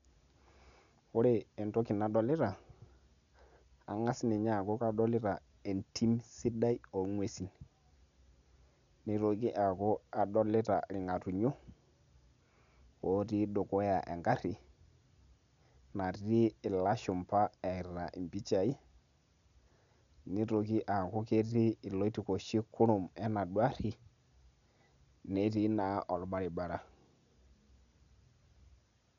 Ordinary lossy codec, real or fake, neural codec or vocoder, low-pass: none; real; none; 7.2 kHz